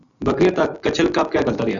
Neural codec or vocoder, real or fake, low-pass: none; real; 7.2 kHz